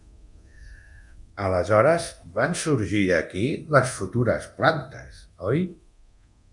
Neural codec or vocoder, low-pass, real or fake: codec, 24 kHz, 0.9 kbps, DualCodec; 10.8 kHz; fake